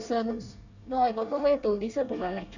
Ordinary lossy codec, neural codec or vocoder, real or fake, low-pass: none; codec, 24 kHz, 1 kbps, SNAC; fake; 7.2 kHz